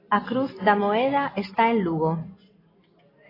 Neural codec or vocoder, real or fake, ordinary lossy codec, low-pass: none; real; AAC, 24 kbps; 5.4 kHz